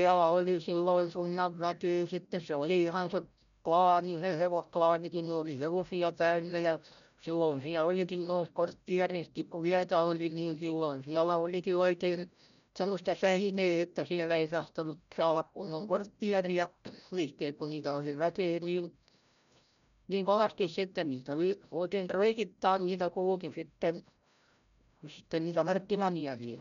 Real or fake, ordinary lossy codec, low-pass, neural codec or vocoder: fake; none; 7.2 kHz; codec, 16 kHz, 0.5 kbps, FreqCodec, larger model